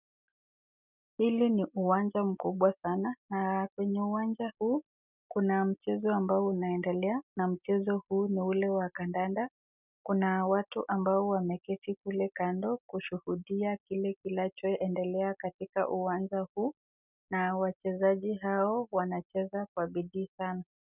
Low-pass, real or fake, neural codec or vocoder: 3.6 kHz; real; none